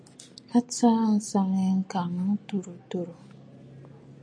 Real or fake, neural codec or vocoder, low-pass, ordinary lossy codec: real; none; 9.9 kHz; MP3, 48 kbps